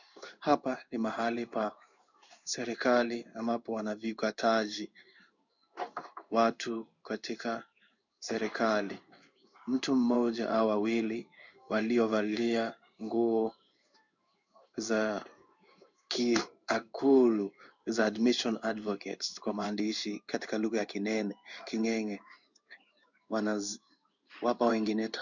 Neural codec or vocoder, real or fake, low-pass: codec, 16 kHz in and 24 kHz out, 1 kbps, XY-Tokenizer; fake; 7.2 kHz